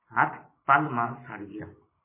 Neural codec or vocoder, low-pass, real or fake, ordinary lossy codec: vocoder, 44.1 kHz, 128 mel bands every 256 samples, BigVGAN v2; 3.6 kHz; fake; MP3, 16 kbps